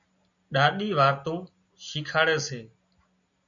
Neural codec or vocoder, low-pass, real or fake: none; 7.2 kHz; real